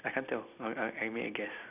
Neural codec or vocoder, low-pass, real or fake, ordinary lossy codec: none; 3.6 kHz; real; none